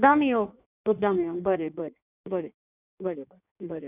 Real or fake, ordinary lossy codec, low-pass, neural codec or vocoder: fake; none; 3.6 kHz; codec, 16 kHz in and 24 kHz out, 1.1 kbps, FireRedTTS-2 codec